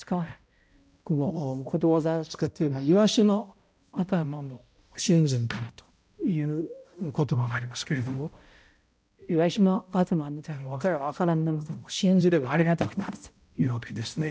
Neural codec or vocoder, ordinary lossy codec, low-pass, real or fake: codec, 16 kHz, 0.5 kbps, X-Codec, HuBERT features, trained on balanced general audio; none; none; fake